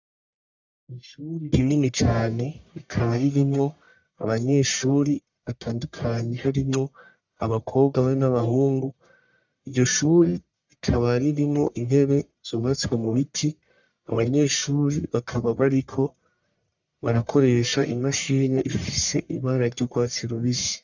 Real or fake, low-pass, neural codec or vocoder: fake; 7.2 kHz; codec, 44.1 kHz, 1.7 kbps, Pupu-Codec